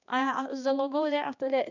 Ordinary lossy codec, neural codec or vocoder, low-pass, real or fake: none; codec, 16 kHz, 2 kbps, X-Codec, HuBERT features, trained on balanced general audio; 7.2 kHz; fake